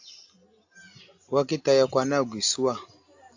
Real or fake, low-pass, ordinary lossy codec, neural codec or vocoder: real; 7.2 kHz; AAC, 48 kbps; none